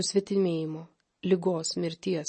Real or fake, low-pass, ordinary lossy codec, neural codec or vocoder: real; 10.8 kHz; MP3, 32 kbps; none